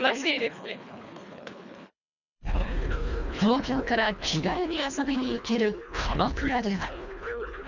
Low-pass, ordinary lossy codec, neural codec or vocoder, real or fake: 7.2 kHz; none; codec, 24 kHz, 1.5 kbps, HILCodec; fake